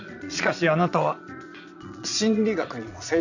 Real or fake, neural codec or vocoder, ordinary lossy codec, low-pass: fake; vocoder, 22.05 kHz, 80 mel bands, Vocos; none; 7.2 kHz